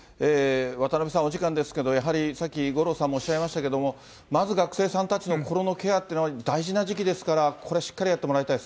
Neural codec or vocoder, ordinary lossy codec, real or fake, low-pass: none; none; real; none